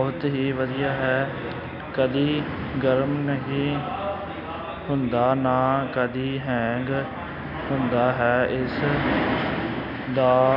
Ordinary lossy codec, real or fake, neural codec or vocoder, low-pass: none; real; none; 5.4 kHz